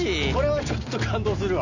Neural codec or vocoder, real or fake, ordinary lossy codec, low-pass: none; real; none; 7.2 kHz